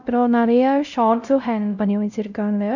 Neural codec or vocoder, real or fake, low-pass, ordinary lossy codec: codec, 16 kHz, 0.5 kbps, X-Codec, WavLM features, trained on Multilingual LibriSpeech; fake; 7.2 kHz; none